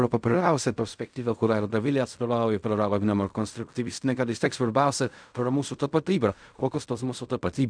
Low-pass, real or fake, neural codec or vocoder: 9.9 kHz; fake; codec, 16 kHz in and 24 kHz out, 0.4 kbps, LongCat-Audio-Codec, fine tuned four codebook decoder